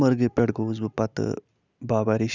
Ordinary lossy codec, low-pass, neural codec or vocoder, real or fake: none; 7.2 kHz; none; real